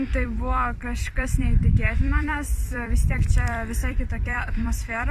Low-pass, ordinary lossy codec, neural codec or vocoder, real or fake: 14.4 kHz; MP3, 96 kbps; vocoder, 44.1 kHz, 128 mel bands every 256 samples, BigVGAN v2; fake